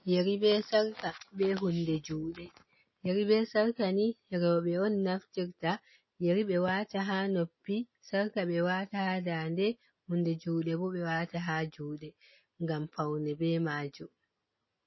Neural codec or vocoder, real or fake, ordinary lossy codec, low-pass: none; real; MP3, 24 kbps; 7.2 kHz